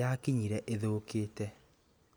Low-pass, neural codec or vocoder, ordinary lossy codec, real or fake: none; none; none; real